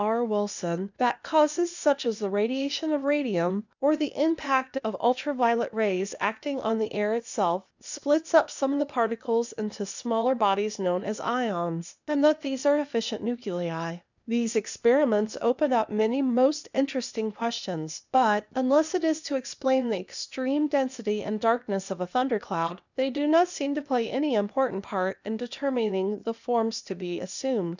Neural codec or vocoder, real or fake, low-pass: codec, 16 kHz, 0.8 kbps, ZipCodec; fake; 7.2 kHz